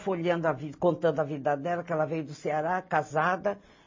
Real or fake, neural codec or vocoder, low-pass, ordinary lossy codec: real; none; 7.2 kHz; MP3, 32 kbps